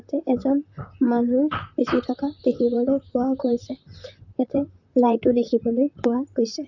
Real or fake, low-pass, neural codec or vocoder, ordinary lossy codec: fake; 7.2 kHz; vocoder, 44.1 kHz, 128 mel bands, Pupu-Vocoder; none